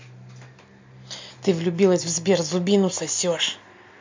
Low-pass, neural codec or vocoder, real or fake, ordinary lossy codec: 7.2 kHz; none; real; MP3, 48 kbps